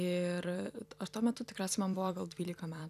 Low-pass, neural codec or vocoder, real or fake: 14.4 kHz; vocoder, 44.1 kHz, 128 mel bands every 256 samples, BigVGAN v2; fake